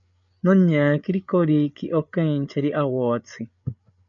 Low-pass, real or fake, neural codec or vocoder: 7.2 kHz; fake; codec, 16 kHz, 8 kbps, FreqCodec, larger model